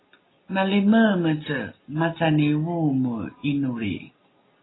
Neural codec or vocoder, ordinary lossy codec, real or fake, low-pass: none; AAC, 16 kbps; real; 7.2 kHz